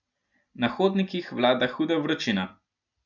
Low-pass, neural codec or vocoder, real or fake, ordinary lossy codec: 7.2 kHz; none; real; none